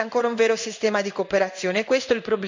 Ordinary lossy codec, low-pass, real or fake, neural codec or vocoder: MP3, 64 kbps; 7.2 kHz; fake; codec, 16 kHz in and 24 kHz out, 1 kbps, XY-Tokenizer